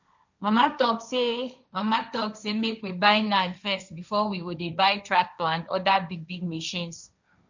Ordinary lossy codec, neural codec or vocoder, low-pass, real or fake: none; codec, 16 kHz, 1.1 kbps, Voila-Tokenizer; 7.2 kHz; fake